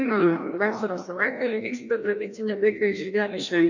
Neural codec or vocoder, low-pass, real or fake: codec, 16 kHz, 1 kbps, FreqCodec, larger model; 7.2 kHz; fake